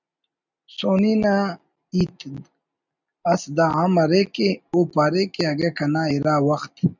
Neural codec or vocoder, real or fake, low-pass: none; real; 7.2 kHz